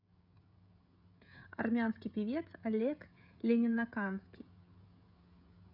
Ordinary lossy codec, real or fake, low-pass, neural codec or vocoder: none; fake; 5.4 kHz; codec, 16 kHz, 16 kbps, FreqCodec, smaller model